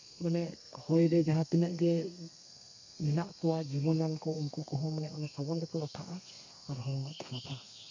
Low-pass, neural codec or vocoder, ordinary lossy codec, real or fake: 7.2 kHz; codec, 32 kHz, 1.9 kbps, SNAC; MP3, 64 kbps; fake